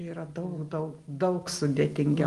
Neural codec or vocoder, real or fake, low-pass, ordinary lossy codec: none; real; 10.8 kHz; Opus, 24 kbps